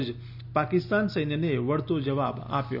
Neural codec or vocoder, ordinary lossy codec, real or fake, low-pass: none; none; real; 5.4 kHz